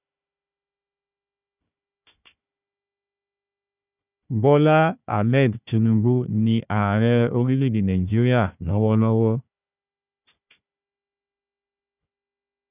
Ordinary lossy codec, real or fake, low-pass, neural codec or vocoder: none; fake; 3.6 kHz; codec, 16 kHz, 1 kbps, FunCodec, trained on Chinese and English, 50 frames a second